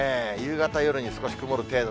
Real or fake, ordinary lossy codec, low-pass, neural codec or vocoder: real; none; none; none